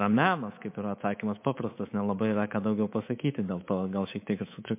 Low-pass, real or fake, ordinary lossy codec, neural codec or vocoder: 3.6 kHz; fake; MP3, 24 kbps; codec, 24 kHz, 3.1 kbps, DualCodec